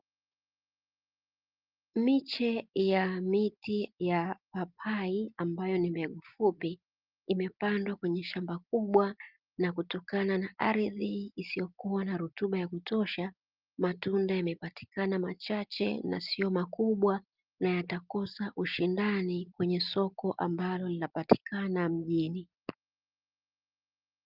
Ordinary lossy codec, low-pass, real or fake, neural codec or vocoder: Opus, 32 kbps; 5.4 kHz; real; none